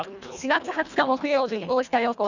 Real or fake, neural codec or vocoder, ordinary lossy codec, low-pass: fake; codec, 24 kHz, 1.5 kbps, HILCodec; none; 7.2 kHz